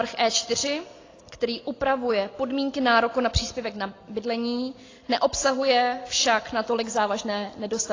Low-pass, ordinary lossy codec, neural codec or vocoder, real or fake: 7.2 kHz; AAC, 32 kbps; none; real